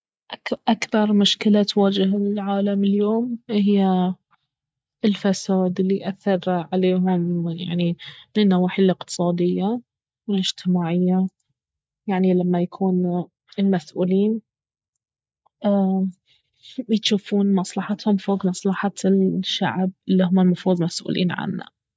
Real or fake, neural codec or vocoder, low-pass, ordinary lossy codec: real; none; none; none